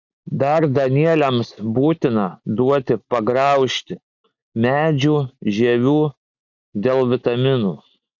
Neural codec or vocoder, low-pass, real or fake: none; 7.2 kHz; real